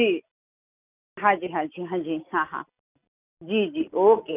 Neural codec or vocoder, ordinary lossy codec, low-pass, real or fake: none; none; 3.6 kHz; real